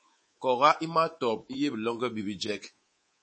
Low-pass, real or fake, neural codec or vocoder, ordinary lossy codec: 9.9 kHz; fake; codec, 24 kHz, 3.1 kbps, DualCodec; MP3, 32 kbps